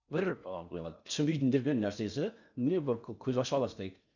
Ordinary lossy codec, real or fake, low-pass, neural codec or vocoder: none; fake; 7.2 kHz; codec, 16 kHz in and 24 kHz out, 0.6 kbps, FocalCodec, streaming, 4096 codes